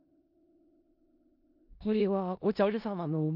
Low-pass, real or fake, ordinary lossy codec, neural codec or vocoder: 5.4 kHz; fake; none; codec, 16 kHz in and 24 kHz out, 0.4 kbps, LongCat-Audio-Codec, four codebook decoder